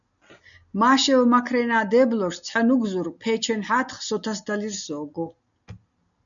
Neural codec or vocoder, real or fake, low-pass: none; real; 7.2 kHz